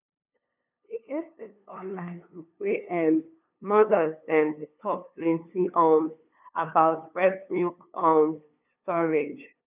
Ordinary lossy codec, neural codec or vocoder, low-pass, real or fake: none; codec, 16 kHz, 2 kbps, FunCodec, trained on LibriTTS, 25 frames a second; 3.6 kHz; fake